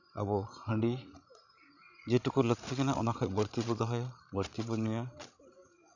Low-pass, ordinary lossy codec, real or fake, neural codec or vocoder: 7.2 kHz; none; fake; codec, 16 kHz, 16 kbps, FreqCodec, larger model